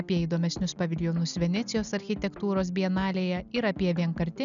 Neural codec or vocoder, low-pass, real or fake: none; 7.2 kHz; real